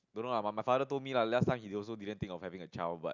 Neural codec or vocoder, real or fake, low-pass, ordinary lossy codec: none; real; 7.2 kHz; none